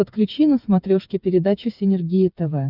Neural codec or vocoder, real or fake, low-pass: codec, 16 kHz, 8 kbps, FreqCodec, smaller model; fake; 5.4 kHz